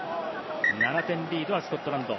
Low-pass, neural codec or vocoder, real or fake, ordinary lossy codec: 7.2 kHz; none; real; MP3, 24 kbps